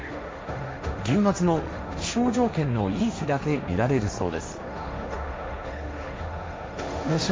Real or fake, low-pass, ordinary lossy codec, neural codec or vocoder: fake; none; none; codec, 16 kHz, 1.1 kbps, Voila-Tokenizer